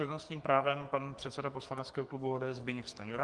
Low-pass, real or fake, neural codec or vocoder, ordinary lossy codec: 10.8 kHz; fake; codec, 44.1 kHz, 2.6 kbps, SNAC; Opus, 24 kbps